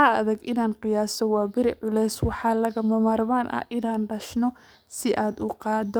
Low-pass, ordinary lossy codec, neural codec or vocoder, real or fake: none; none; codec, 44.1 kHz, 7.8 kbps, DAC; fake